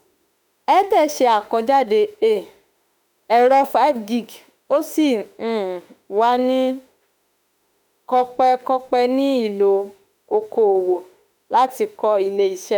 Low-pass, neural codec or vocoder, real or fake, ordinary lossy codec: none; autoencoder, 48 kHz, 32 numbers a frame, DAC-VAE, trained on Japanese speech; fake; none